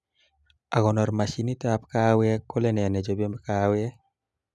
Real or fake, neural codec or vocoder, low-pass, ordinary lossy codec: real; none; none; none